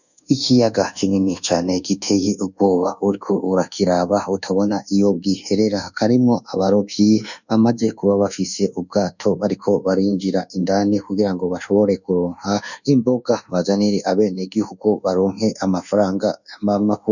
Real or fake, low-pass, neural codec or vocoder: fake; 7.2 kHz; codec, 24 kHz, 1.2 kbps, DualCodec